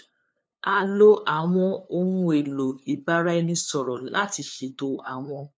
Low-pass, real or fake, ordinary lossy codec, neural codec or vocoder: none; fake; none; codec, 16 kHz, 2 kbps, FunCodec, trained on LibriTTS, 25 frames a second